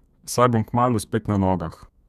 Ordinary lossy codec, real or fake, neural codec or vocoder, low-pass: none; fake; codec, 32 kHz, 1.9 kbps, SNAC; 14.4 kHz